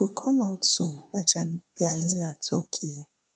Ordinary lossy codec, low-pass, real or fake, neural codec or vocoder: none; 9.9 kHz; fake; codec, 24 kHz, 1 kbps, SNAC